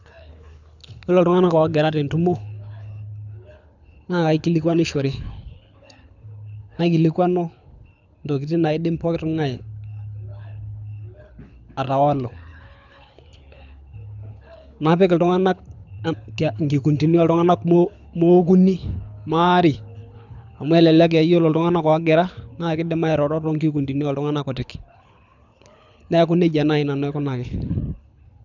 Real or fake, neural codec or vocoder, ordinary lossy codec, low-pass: fake; codec, 24 kHz, 6 kbps, HILCodec; none; 7.2 kHz